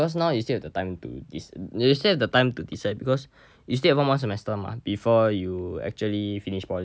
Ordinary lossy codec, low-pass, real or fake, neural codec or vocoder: none; none; real; none